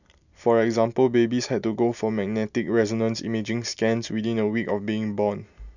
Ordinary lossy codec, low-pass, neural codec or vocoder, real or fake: none; 7.2 kHz; none; real